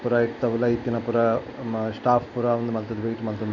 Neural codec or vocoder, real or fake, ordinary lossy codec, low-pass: none; real; none; 7.2 kHz